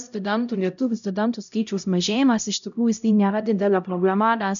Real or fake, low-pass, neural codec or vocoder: fake; 7.2 kHz; codec, 16 kHz, 0.5 kbps, X-Codec, HuBERT features, trained on LibriSpeech